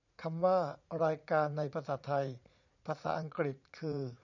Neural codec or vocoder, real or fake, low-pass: vocoder, 44.1 kHz, 80 mel bands, Vocos; fake; 7.2 kHz